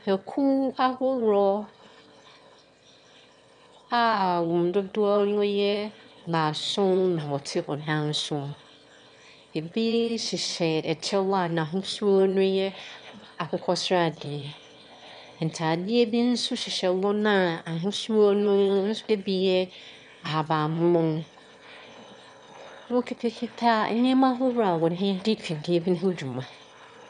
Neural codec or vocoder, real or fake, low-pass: autoencoder, 22.05 kHz, a latent of 192 numbers a frame, VITS, trained on one speaker; fake; 9.9 kHz